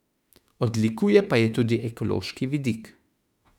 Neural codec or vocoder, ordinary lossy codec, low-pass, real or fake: autoencoder, 48 kHz, 32 numbers a frame, DAC-VAE, trained on Japanese speech; none; 19.8 kHz; fake